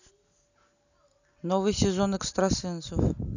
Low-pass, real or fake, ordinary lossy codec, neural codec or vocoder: 7.2 kHz; real; none; none